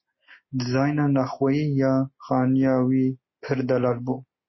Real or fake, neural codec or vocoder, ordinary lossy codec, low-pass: real; none; MP3, 24 kbps; 7.2 kHz